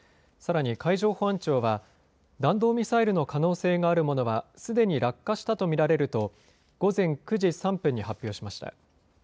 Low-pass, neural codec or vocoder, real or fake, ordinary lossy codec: none; none; real; none